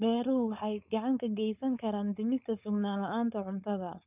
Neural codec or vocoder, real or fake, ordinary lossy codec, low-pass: codec, 16 kHz, 4.8 kbps, FACodec; fake; none; 3.6 kHz